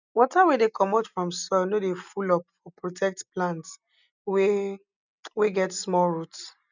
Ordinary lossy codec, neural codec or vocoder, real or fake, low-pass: none; none; real; 7.2 kHz